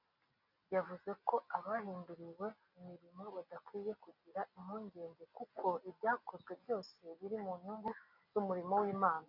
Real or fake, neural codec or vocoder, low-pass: real; none; 5.4 kHz